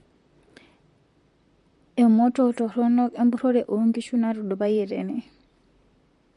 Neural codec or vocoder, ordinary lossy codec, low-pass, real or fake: vocoder, 44.1 kHz, 128 mel bands, Pupu-Vocoder; MP3, 48 kbps; 14.4 kHz; fake